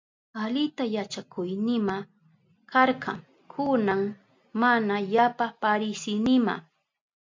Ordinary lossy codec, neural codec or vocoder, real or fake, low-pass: MP3, 64 kbps; none; real; 7.2 kHz